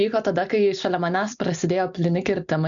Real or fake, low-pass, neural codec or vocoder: real; 7.2 kHz; none